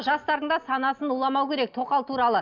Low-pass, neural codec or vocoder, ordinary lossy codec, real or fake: 7.2 kHz; none; none; real